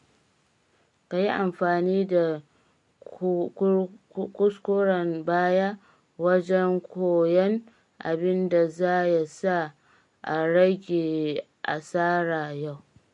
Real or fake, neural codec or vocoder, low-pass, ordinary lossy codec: real; none; 10.8 kHz; MP3, 48 kbps